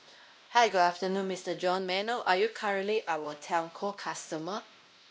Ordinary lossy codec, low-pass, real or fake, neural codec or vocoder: none; none; fake; codec, 16 kHz, 1 kbps, X-Codec, WavLM features, trained on Multilingual LibriSpeech